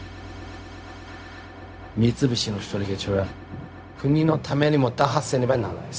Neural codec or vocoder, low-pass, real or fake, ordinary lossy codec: codec, 16 kHz, 0.4 kbps, LongCat-Audio-Codec; none; fake; none